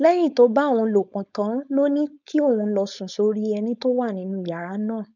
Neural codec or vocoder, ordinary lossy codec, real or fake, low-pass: codec, 16 kHz, 4.8 kbps, FACodec; none; fake; 7.2 kHz